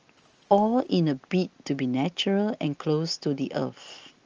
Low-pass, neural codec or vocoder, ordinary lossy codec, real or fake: 7.2 kHz; none; Opus, 24 kbps; real